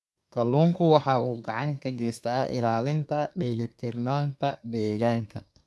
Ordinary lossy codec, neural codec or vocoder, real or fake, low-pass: none; codec, 24 kHz, 1 kbps, SNAC; fake; none